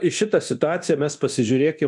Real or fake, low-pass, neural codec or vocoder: fake; 10.8 kHz; codec, 24 kHz, 0.9 kbps, DualCodec